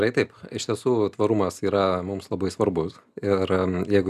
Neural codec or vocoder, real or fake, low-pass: none; real; 14.4 kHz